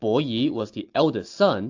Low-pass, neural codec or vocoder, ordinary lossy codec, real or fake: 7.2 kHz; none; AAC, 48 kbps; real